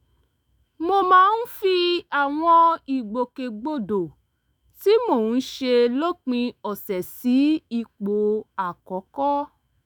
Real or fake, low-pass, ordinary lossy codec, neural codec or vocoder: fake; none; none; autoencoder, 48 kHz, 128 numbers a frame, DAC-VAE, trained on Japanese speech